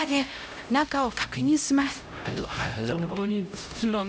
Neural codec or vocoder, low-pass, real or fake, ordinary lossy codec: codec, 16 kHz, 0.5 kbps, X-Codec, HuBERT features, trained on LibriSpeech; none; fake; none